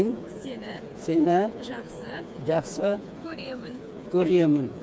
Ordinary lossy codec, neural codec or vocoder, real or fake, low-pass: none; codec, 16 kHz, 4 kbps, FreqCodec, smaller model; fake; none